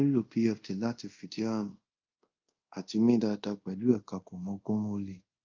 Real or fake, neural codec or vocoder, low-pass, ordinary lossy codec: fake; codec, 24 kHz, 0.5 kbps, DualCodec; 7.2 kHz; Opus, 24 kbps